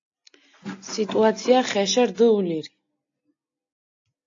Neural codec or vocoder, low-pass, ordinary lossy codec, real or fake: none; 7.2 kHz; AAC, 48 kbps; real